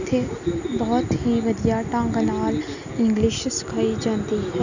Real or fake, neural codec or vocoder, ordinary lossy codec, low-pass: real; none; none; 7.2 kHz